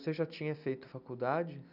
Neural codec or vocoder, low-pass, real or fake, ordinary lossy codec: none; 5.4 kHz; real; none